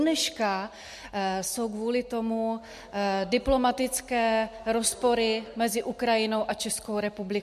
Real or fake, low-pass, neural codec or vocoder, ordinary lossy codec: real; 14.4 kHz; none; MP3, 64 kbps